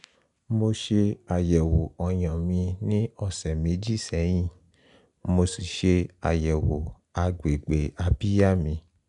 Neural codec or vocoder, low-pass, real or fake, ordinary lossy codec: none; 10.8 kHz; real; none